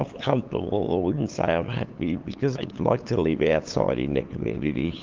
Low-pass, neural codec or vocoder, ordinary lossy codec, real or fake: 7.2 kHz; codec, 16 kHz, 8 kbps, FunCodec, trained on LibriTTS, 25 frames a second; Opus, 32 kbps; fake